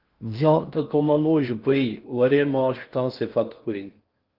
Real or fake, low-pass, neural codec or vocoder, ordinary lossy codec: fake; 5.4 kHz; codec, 16 kHz in and 24 kHz out, 0.6 kbps, FocalCodec, streaming, 4096 codes; Opus, 32 kbps